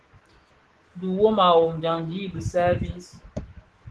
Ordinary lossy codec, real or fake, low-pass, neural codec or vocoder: Opus, 16 kbps; fake; 10.8 kHz; autoencoder, 48 kHz, 128 numbers a frame, DAC-VAE, trained on Japanese speech